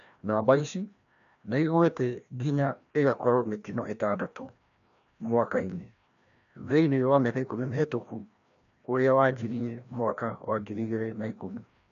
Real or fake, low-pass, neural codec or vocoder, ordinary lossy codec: fake; 7.2 kHz; codec, 16 kHz, 1 kbps, FreqCodec, larger model; none